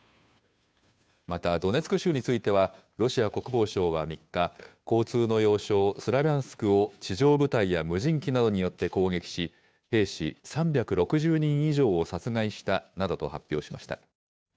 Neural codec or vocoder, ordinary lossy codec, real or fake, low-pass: codec, 16 kHz, 2 kbps, FunCodec, trained on Chinese and English, 25 frames a second; none; fake; none